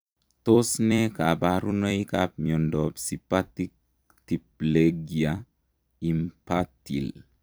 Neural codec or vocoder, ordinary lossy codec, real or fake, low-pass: vocoder, 44.1 kHz, 128 mel bands every 256 samples, BigVGAN v2; none; fake; none